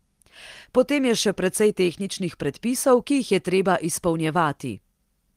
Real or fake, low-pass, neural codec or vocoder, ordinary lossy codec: real; 14.4 kHz; none; Opus, 24 kbps